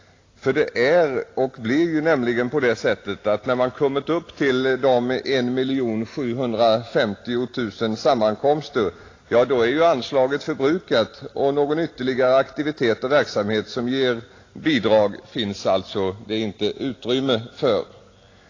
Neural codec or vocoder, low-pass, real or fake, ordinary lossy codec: none; 7.2 kHz; real; AAC, 32 kbps